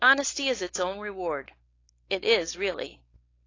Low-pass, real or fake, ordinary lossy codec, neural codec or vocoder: 7.2 kHz; fake; AAC, 32 kbps; codec, 16 kHz, 4.8 kbps, FACodec